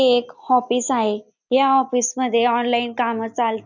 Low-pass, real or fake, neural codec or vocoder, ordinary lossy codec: 7.2 kHz; real; none; none